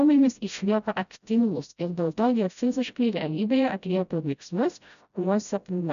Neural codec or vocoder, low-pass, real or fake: codec, 16 kHz, 0.5 kbps, FreqCodec, smaller model; 7.2 kHz; fake